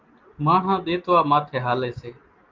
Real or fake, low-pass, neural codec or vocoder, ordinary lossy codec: real; 7.2 kHz; none; Opus, 32 kbps